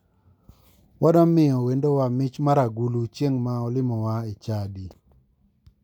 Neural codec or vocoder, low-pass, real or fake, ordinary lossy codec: none; 19.8 kHz; real; none